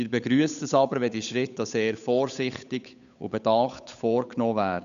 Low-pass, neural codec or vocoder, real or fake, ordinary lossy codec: 7.2 kHz; codec, 16 kHz, 8 kbps, FunCodec, trained on Chinese and English, 25 frames a second; fake; none